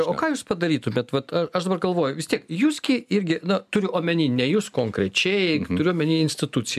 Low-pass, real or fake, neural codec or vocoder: 14.4 kHz; real; none